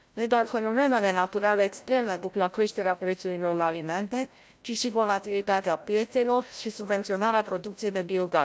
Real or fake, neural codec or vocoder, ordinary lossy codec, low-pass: fake; codec, 16 kHz, 0.5 kbps, FreqCodec, larger model; none; none